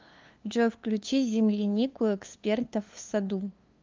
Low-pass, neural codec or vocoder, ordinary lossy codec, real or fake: 7.2 kHz; codec, 16 kHz, 2 kbps, FunCodec, trained on LibriTTS, 25 frames a second; Opus, 24 kbps; fake